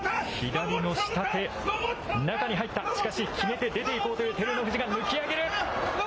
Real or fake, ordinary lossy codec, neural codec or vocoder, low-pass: real; none; none; none